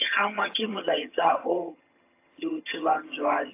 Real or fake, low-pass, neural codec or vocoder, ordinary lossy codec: fake; 3.6 kHz; vocoder, 22.05 kHz, 80 mel bands, HiFi-GAN; AAC, 24 kbps